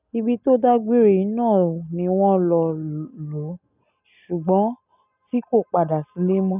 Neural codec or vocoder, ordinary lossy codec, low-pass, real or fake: none; none; 3.6 kHz; real